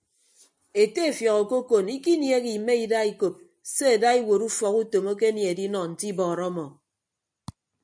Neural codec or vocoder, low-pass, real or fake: none; 9.9 kHz; real